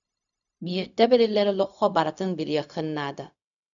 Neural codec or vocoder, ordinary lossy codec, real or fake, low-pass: codec, 16 kHz, 0.4 kbps, LongCat-Audio-Codec; MP3, 96 kbps; fake; 7.2 kHz